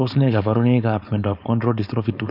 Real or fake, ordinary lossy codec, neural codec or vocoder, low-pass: fake; none; codec, 16 kHz, 4.8 kbps, FACodec; 5.4 kHz